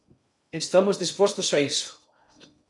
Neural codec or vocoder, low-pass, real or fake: codec, 16 kHz in and 24 kHz out, 0.6 kbps, FocalCodec, streaming, 2048 codes; 10.8 kHz; fake